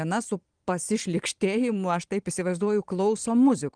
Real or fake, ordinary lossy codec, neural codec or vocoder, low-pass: fake; Opus, 24 kbps; vocoder, 44.1 kHz, 128 mel bands every 512 samples, BigVGAN v2; 9.9 kHz